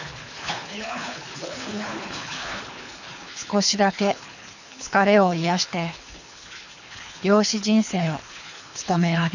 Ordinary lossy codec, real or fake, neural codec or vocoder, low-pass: none; fake; codec, 24 kHz, 3 kbps, HILCodec; 7.2 kHz